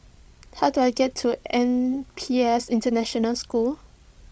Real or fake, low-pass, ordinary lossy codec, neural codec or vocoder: fake; none; none; codec, 16 kHz, 16 kbps, FreqCodec, larger model